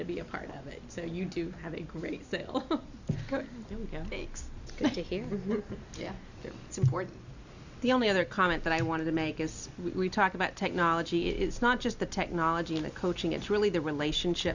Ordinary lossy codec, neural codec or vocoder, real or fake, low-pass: Opus, 64 kbps; none; real; 7.2 kHz